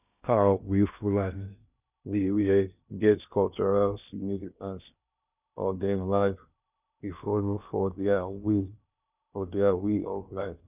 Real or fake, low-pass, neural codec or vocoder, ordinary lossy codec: fake; 3.6 kHz; codec, 16 kHz in and 24 kHz out, 0.6 kbps, FocalCodec, streaming, 2048 codes; none